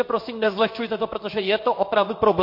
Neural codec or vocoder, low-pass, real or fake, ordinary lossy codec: codec, 16 kHz in and 24 kHz out, 1 kbps, XY-Tokenizer; 5.4 kHz; fake; MP3, 32 kbps